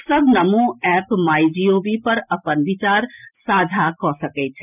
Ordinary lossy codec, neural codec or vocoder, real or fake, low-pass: none; none; real; 3.6 kHz